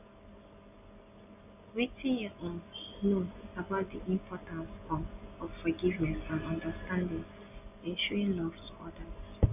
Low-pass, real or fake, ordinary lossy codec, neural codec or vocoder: 3.6 kHz; real; none; none